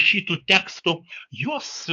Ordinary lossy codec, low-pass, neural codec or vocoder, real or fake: AAC, 64 kbps; 7.2 kHz; codec, 16 kHz, 4 kbps, X-Codec, WavLM features, trained on Multilingual LibriSpeech; fake